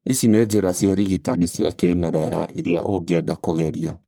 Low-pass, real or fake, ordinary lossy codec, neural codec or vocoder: none; fake; none; codec, 44.1 kHz, 1.7 kbps, Pupu-Codec